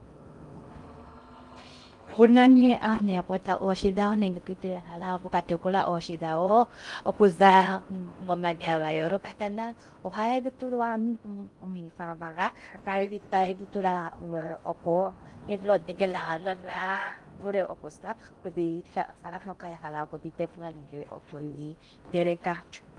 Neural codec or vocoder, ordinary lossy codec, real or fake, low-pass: codec, 16 kHz in and 24 kHz out, 0.6 kbps, FocalCodec, streaming, 2048 codes; Opus, 32 kbps; fake; 10.8 kHz